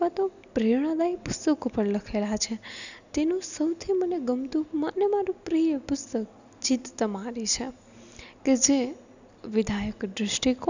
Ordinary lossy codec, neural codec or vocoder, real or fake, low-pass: none; none; real; 7.2 kHz